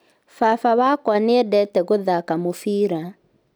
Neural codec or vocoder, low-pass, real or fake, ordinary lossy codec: none; none; real; none